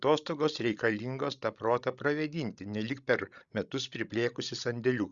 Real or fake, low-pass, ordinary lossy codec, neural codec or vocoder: fake; 7.2 kHz; Opus, 64 kbps; codec, 16 kHz, 16 kbps, FreqCodec, larger model